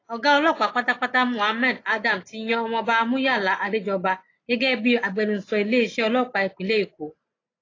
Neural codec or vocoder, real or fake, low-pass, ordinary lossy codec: none; real; 7.2 kHz; AAC, 32 kbps